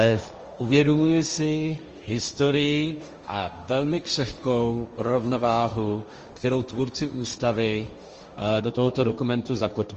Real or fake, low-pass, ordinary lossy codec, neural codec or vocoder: fake; 7.2 kHz; Opus, 24 kbps; codec, 16 kHz, 1.1 kbps, Voila-Tokenizer